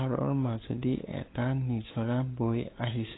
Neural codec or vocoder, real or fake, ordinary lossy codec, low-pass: codec, 16 kHz, 8 kbps, FunCodec, trained on LibriTTS, 25 frames a second; fake; AAC, 16 kbps; 7.2 kHz